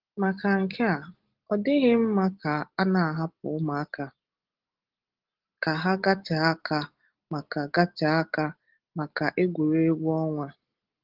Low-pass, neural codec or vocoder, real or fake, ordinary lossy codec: 5.4 kHz; none; real; Opus, 16 kbps